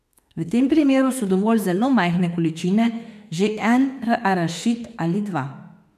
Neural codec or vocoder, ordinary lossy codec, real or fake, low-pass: autoencoder, 48 kHz, 32 numbers a frame, DAC-VAE, trained on Japanese speech; none; fake; 14.4 kHz